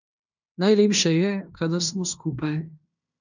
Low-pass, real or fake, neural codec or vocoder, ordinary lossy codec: 7.2 kHz; fake; codec, 16 kHz in and 24 kHz out, 0.9 kbps, LongCat-Audio-Codec, fine tuned four codebook decoder; none